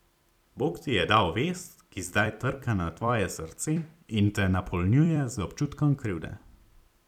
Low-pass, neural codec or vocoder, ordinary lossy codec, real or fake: 19.8 kHz; vocoder, 44.1 kHz, 128 mel bands every 256 samples, BigVGAN v2; none; fake